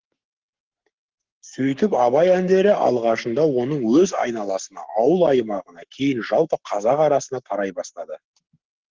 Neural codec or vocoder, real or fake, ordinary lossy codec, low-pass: codec, 44.1 kHz, 7.8 kbps, Pupu-Codec; fake; Opus, 16 kbps; 7.2 kHz